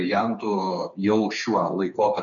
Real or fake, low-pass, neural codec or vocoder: fake; 7.2 kHz; codec, 16 kHz, 4 kbps, FreqCodec, smaller model